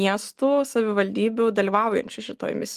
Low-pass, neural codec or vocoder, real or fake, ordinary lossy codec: 14.4 kHz; none; real; Opus, 24 kbps